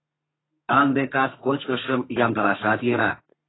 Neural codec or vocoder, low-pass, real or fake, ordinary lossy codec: codec, 32 kHz, 1.9 kbps, SNAC; 7.2 kHz; fake; AAC, 16 kbps